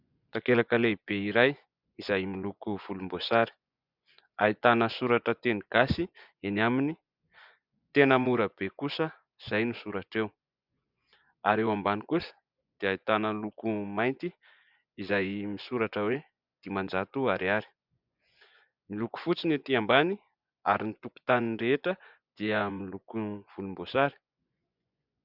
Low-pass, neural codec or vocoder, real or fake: 5.4 kHz; vocoder, 24 kHz, 100 mel bands, Vocos; fake